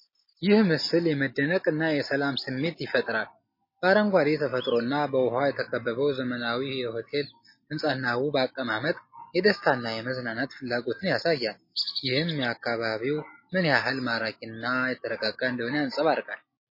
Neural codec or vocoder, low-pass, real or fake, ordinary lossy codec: none; 5.4 kHz; real; MP3, 24 kbps